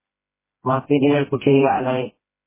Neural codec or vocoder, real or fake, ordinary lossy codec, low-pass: codec, 16 kHz, 1 kbps, FreqCodec, smaller model; fake; MP3, 16 kbps; 3.6 kHz